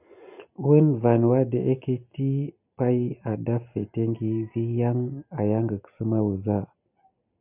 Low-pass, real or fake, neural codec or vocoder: 3.6 kHz; real; none